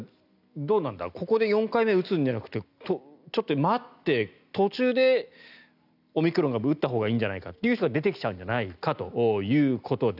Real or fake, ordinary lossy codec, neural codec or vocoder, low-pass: real; none; none; 5.4 kHz